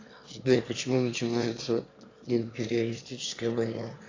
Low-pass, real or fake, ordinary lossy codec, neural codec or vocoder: 7.2 kHz; fake; AAC, 32 kbps; autoencoder, 22.05 kHz, a latent of 192 numbers a frame, VITS, trained on one speaker